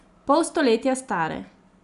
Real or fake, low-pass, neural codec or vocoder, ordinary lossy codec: real; 10.8 kHz; none; none